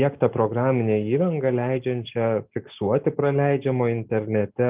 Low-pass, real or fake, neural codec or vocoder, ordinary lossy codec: 3.6 kHz; real; none; Opus, 24 kbps